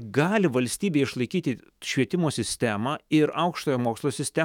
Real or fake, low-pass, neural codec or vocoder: fake; 19.8 kHz; autoencoder, 48 kHz, 128 numbers a frame, DAC-VAE, trained on Japanese speech